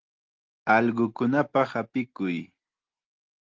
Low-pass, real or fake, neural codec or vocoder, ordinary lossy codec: 7.2 kHz; real; none; Opus, 16 kbps